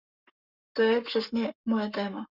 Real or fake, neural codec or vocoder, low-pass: fake; codec, 44.1 kHz, 7.8 kbps, Pupu-Codec; 5.4 kHz